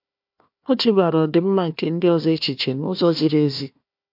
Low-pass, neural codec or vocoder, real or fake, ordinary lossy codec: 5.4 kHz; codec, 16 kHz, 1 kbps, FunCodec, trained on Chinese and English, 50 frames a second; fake; MP3, 48 kbps